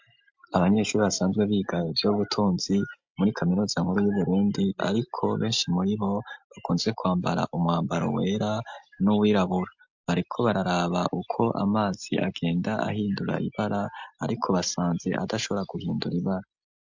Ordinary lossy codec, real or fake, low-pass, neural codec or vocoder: MP3, 64 kbps; real; 7.2 kHz; none